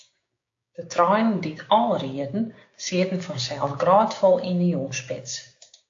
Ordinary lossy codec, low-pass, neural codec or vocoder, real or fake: AAC, 48 kbps; 7.2 kHz; codec, 16 kHz, 6 kbps, DAC; fake